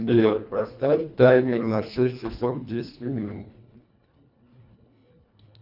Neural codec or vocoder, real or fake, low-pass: codec, 24 kHz, 1.5 kbps, HILCodec; fake; 5.4 kHz